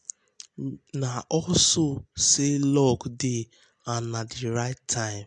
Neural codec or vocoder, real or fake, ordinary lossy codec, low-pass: none; real; MP3, 64 kbps; 9.9 kHz